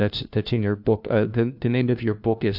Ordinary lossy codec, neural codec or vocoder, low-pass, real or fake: AAC, 48 kbps; codec, 16 kHz, 1 kbps, FunCodec, trained on LibriTTS, 50 frames a second; 5.4 kHz; fake